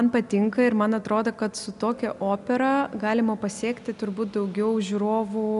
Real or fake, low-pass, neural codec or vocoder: real; 10.8 kHz; none